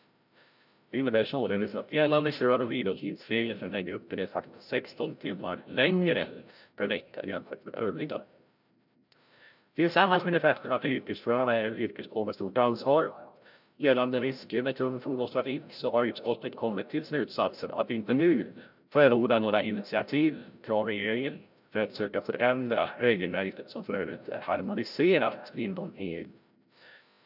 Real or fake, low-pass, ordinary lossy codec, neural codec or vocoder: fake; 5.4 kHz; none; codec, 16 kHz, 0.5 kbps, FreqCodec, larger model